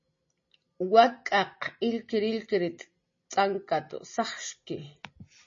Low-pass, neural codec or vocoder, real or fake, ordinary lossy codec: 7.2 kHz; none; real; MP3, 32 kbps